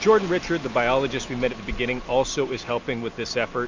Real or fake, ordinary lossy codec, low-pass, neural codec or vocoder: real; MP3, 64 kbps; 7.2 kHz; none